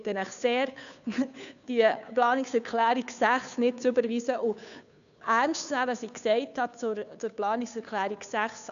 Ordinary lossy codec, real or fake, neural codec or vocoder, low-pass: none; fake; codec, 16 kHz, 2 kbps, FunCodec, trained on Chinese and English, 25 frames a second; 7.2 kHz